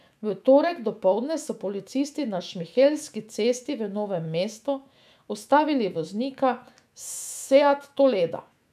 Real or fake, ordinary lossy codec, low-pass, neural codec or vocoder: fake; none; 14.4 kHz; autoencoder, 48 kHz, 128 numbers a frame, DAC-VAE, trained on Japanese speech